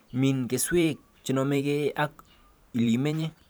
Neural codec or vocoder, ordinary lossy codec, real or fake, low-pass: vocoder, 44.1 kHz, 128 mel bands every 512 samples, BigVGAN v2; none; fake; none